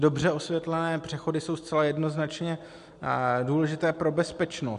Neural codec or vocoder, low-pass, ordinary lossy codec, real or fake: none; 10.8 kHz; MP3, 64 kbps; real